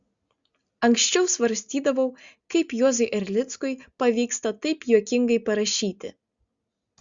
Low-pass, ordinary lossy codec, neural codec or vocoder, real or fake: 7.2 kHz; Opus, 64 kbps; none; real